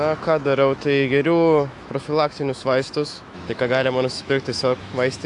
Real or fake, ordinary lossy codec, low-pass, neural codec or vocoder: fake; AAC, 64 kbps; 10.8 kHz; autoencoder, 48 kHz, 128 numbers a frame, DAC-VAE, trained on Japanese speech